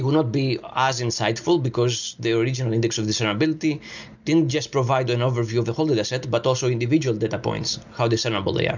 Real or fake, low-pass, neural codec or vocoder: real; 7.2 kHz; none